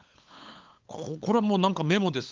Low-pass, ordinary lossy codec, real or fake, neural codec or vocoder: 7.2 kHz; Opus, 32 kbps; fake; codec, 16 kHz, 8 kbps, FunCodec, trained on LibriTTS, 25 frames a second